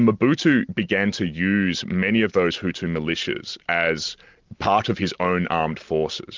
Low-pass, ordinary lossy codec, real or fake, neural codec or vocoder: 7.2 kHz; Opus, 32 kbps; real; none